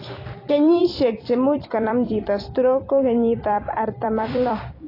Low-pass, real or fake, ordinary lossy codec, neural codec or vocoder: 5.4 kHz; fake; AAC, 24 kbps; vocoder, 44.1 kHz, 128 mel bands every 256 samples, BigVGAN v2